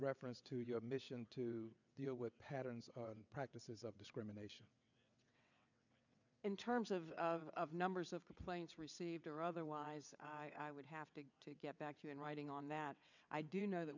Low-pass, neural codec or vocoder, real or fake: 7.2 kHz; vocoder, 22.05 kHz, 80 mel bands, WaveNeXt; fake